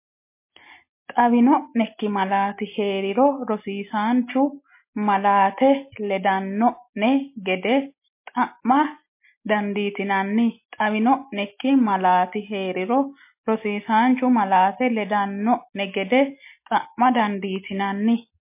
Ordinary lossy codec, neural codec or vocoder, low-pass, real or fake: MP3, 24 kbps; none; 3.6 kHz; real